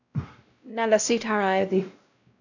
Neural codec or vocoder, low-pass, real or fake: codec, 16 kHz, 0.5 kbps, X-Codec, WavLM features, trained on Multilingual LibriSpeech; 7.2 kHz; fake